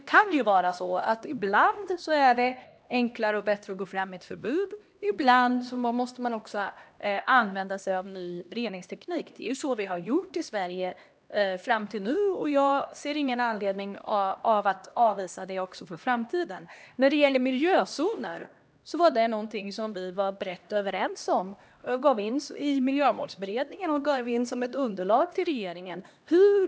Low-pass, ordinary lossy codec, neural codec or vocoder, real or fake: none; none; codec, 16 kHz, 1 kbps, X-Codec, HuBERT features, trained on LibriSpeech; fake